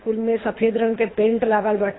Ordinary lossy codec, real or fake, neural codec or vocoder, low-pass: AAC, 16 kbps; fake; codec, 24 kHz, 3 kbps, HILCodec; 7.2 kHz